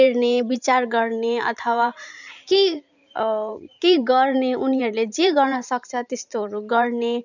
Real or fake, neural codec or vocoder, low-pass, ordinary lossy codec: fake; vocoder, 44.1 kHz, 128 mel bands every 512 samples, BigVGAN v2; 7.2 kHz; none